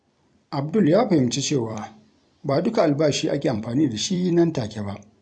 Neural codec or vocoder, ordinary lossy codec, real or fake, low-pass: vocoder, 44.1 kHz, 128 mel bands every 256 samples, BigVGAN v2; none; fake; 9.9 kHz